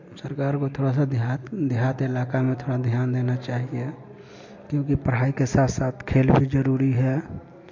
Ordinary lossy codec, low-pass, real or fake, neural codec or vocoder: MP3, 48 kbps; 7.2 kHz; real; none